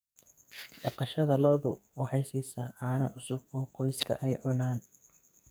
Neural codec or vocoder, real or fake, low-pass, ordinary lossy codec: codec, 44.1 kHz, 2.6 kbps, SNAC; fake; none; none